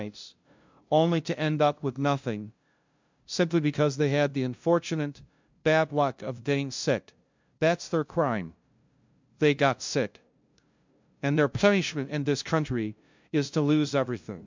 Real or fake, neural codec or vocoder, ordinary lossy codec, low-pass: fake; codec, 16 kHz, 0.5 kbps, FunCodec, trained on LibriTTS, 25 frames a second; MP3, 64 kbps; 7.2 kHz